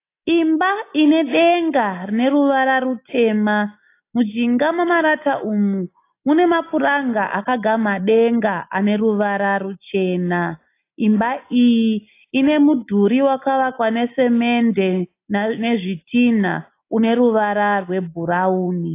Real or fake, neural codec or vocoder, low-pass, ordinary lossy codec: real; none; 3.6 kHz; AAC, 24 kbps